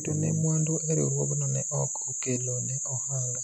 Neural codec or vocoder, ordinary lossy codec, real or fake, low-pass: none; none; real; 14.4 kHz